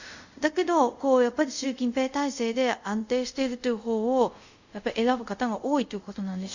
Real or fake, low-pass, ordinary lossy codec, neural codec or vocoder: fake; 7.2 kHz; Opus, 64 kbps; codec, 24 kHz, 0.5 kbps, DualCodec